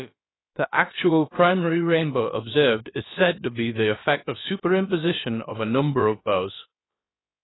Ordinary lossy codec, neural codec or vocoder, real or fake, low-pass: AAC, 16 kbps; codec, 16 kHz, about 1 kbps, DyCAST, with the encoder's durations; fake; 7.2 kHz